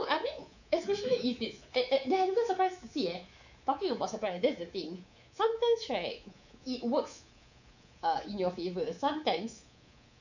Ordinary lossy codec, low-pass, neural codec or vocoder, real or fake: none; 7.2 kHz; codec, 24 kHz, 3.1 kbps, DualCodec; fake